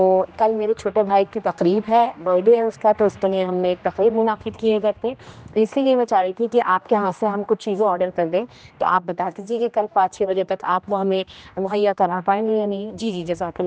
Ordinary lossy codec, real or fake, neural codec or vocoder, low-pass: none; fake; codec, 16 kHz, 1 kbps, X-Codec, HuBERT features, trained on general audio; none